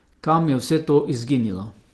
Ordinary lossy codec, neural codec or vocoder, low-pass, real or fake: Opus, 16 kbps; none; 10.8 kHz; real